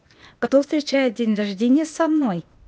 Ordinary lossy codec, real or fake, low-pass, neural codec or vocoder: none; fake; none; codec, 16 kHz, 0.8 kbps, ZipCodec